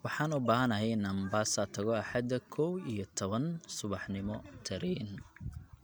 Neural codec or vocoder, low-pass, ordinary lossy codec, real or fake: none; none; none; real